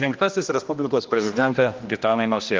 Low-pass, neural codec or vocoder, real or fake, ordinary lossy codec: 7.2 kHz; codec, 16 kHz, 1 kbps, X-Codec, HuBERT features, trained on general audio; fake; Opus, 24 kbps